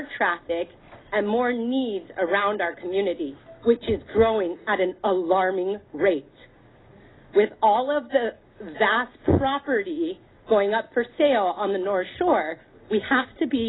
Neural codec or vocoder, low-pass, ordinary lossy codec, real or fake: none; 7.2 kHz; AAC, 16 kbps; real